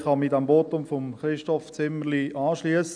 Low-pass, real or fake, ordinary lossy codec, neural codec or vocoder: 9.9 kHz; real; none; none